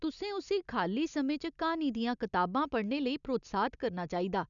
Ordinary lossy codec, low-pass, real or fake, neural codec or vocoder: none; 7.2 kHz; real; none